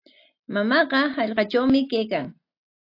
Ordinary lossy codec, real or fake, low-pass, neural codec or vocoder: AAC, 32 kbps; real; 5.4 kHz; none